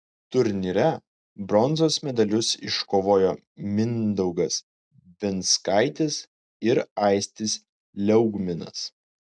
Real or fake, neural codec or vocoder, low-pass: real; none; 9.9 kHz